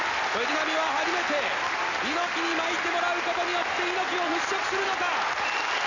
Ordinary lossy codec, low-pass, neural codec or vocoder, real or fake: Opus, 64 kbps; 7.2 kHz; none; real